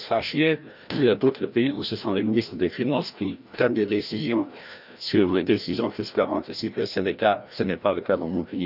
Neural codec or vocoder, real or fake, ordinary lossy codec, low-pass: codec, 16 kHz, 1 kbps, FreqCodec, larger model; fake; none; 5.4 kHz